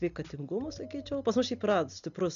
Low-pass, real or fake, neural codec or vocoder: 7.2 kHz; real; none